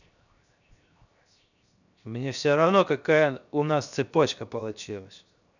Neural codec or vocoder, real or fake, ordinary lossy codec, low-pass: codec, 16 kHz, 0.7 kbps, FocalCodec; fake; none; 7.2 kHz